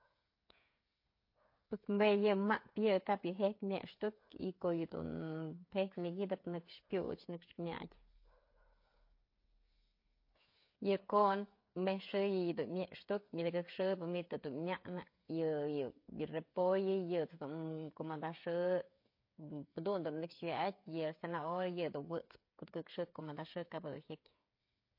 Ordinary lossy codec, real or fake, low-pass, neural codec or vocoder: MP3, 32 kbps; fake; 5.4 kHz; codec, 16 kHz, 8 kbps, FreqCodec, smaller model